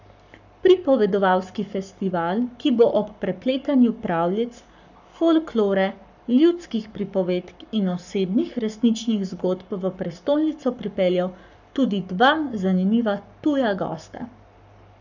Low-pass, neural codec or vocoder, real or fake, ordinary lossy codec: 7.2 kHz; codec, 44.1 kHz, 7.8 kbps, Pupu-Codec; fake; none